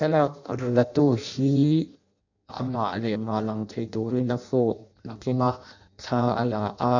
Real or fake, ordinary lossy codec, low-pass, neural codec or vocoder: fake; none; 7.2 kHz; codec, 16 kHz in and 24 kHz out, 0.6 kbps, FireRedTTS-2 codec